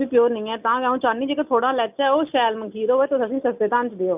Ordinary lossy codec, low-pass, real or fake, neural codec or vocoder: none; 3.6 kHz; real; none